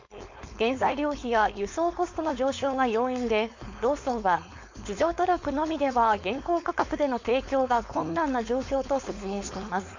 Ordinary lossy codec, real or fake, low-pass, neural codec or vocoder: MP3, 48 kbps; fake; 7.2 kHz; codec, 16 kHz, 4.8 kbps, FACodec